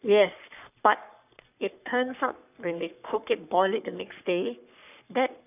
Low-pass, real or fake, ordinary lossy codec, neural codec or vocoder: 3.6 kHz; fake; none; codec, 44.1 kHz, 3.4 kbps, Pupu-Codec